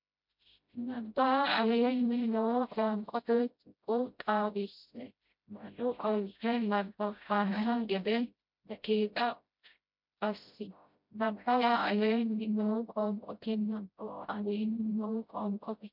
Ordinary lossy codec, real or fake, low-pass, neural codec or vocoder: MP3, 48 kbps; fake; 5.4 kHz; codec, 16 kHz, 0.5 kbps, FreqCodec, smaller model